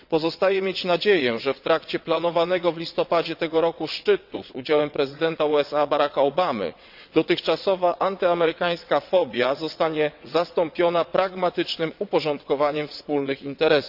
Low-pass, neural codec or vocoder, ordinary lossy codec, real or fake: 5.4 kHz; vocoder, 22.05 kHz, 80 mel bands, WaveNeXt; none; fake